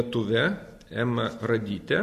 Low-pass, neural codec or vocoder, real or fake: 14.4 kHz; none; real